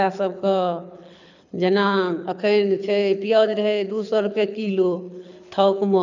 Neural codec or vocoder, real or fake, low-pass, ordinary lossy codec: codec, 24 kHz, 6 kbps, HILCodec; fake; 7.2 kHz; none